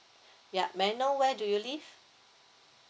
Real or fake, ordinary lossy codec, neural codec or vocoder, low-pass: real; none; none; none